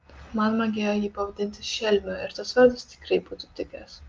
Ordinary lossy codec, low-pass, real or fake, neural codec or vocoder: Opus, 32 kbps; 7.2 kHz; real; none